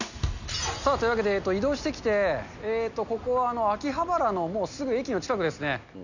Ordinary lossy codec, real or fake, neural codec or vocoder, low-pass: none; real; none; 7.2 kHz